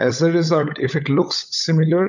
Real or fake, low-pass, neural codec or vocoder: fake; 7.2 kHz; codec, 16 kHz, 16 kbps, FunCodec, trained on LibriTTS, 50 frames a second